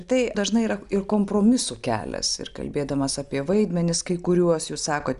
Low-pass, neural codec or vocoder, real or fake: 10.8 kHz; none; real